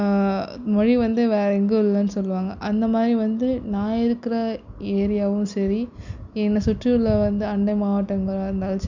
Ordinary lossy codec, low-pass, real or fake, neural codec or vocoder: none; 7.2 kHz; real; none